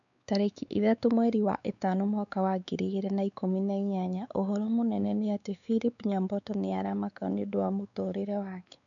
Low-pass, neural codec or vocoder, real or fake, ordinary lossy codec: 7.2 kHz; codec, 16 kHz, 4 kbps, X-Codec, WavLM features, trained on Multilingual LibriSpeech; fake; none